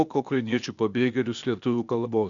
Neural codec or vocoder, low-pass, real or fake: codec, 16 kHz, 0.8 kbps, ZipCodec; 7.2 kHz; fake